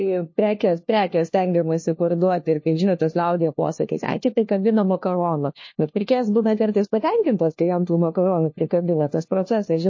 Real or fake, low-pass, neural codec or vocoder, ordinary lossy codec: fake; 7.2 kHz; codec, 16 kHz, 1 kbps, FunCodec, trained on LibriTTS, 50 frames a second; MP3, 32 kbps